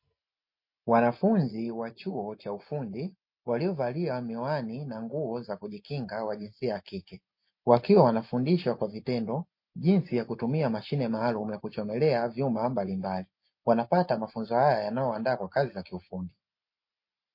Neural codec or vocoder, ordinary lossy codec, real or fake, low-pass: none; MP3, 32 kbps; real; 5.4 kHz